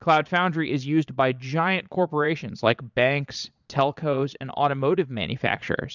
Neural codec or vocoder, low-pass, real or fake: vocoder, 22.05 kHz, 80 mel bands, Vocos; 7.2 kHz; fake